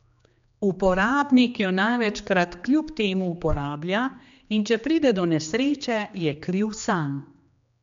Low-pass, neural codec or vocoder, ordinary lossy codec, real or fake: 7.2 kHz; codec, 16 kHz, 2 kbps, X-Codec, HuBERT features, trained on general audio; MP3, 64 kbps; fake